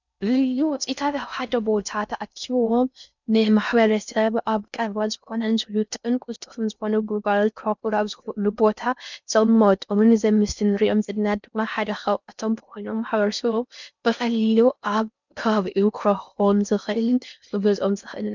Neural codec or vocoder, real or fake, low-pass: codec, 16 kHz in and 24 kHz out, 0.6 kbps, FocalCodec, streaming, 4096 codes; fake; 7.2 kHz